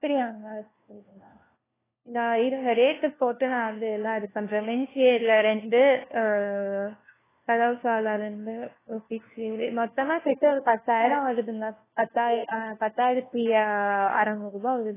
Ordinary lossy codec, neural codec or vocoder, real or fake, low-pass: AAC, 16 kbps; codec, 16 kHz, 1 kbps, FunCodec, trained on LibriTTS, 50 frames a second; fake; 3.6 kHz